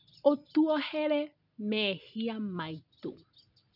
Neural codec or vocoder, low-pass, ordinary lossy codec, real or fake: none; 5.4 kHz; none; real